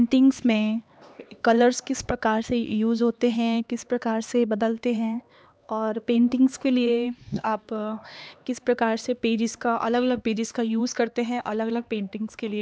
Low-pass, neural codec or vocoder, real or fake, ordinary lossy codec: none; codec, 16 kHz, 2 kbps, X-Codec, HuBERT features, trained on LibriSpeech; fake; none